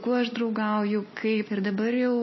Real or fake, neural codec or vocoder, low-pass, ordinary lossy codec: real; none; 7.2 kHz; MP3, 24 kbps